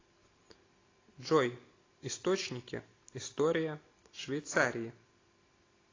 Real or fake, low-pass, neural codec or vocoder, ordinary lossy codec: real; 7.2 kHz; none; AAC, 32 kbps